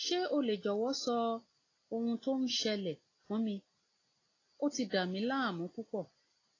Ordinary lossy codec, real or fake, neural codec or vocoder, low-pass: AAC, 32 kbps; real; none; 7.2 kHz